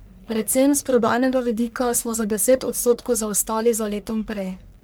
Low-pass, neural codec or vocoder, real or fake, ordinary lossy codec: none; codec, 44.1 kHz, 1.7 kbps, Pupu-Codec; fake; none